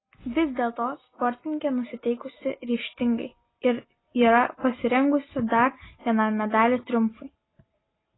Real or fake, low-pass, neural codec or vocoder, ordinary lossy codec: real; 7.2 kHz; none; AAC, 16 kbps